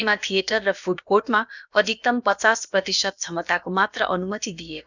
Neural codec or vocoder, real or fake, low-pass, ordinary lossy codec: codec, 16 kHz, about 1 kbps, DyCAST, with the encoder's durations; fake; 7.2 kHz; none